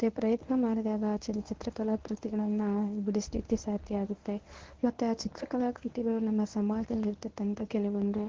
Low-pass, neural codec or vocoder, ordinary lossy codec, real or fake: 7.2 kHz; codec, 24 kHz, 0.9 kbps, WavTokenizer, medium speech release version 1; Opus, 16 kbps; fake